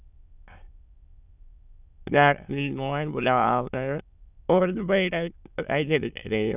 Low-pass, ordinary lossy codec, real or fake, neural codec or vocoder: 3.6 kHz; none; fake; autoencoder, 22.05 kHz, a latent of 192 numbers a frame, VITS, trained on many speakers